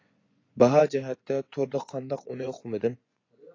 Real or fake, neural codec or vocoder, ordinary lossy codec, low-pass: fake; vocoder, 24 kHz, 100 mel bands, Vocos; AAC, 32 kbps; 7.2 kHz